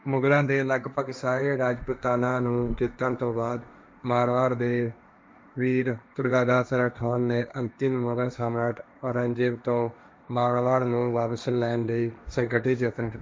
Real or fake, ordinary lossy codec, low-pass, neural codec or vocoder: fake; none; none; codec, 16 kHz, 1.1 kbps, Voila-Tokenizer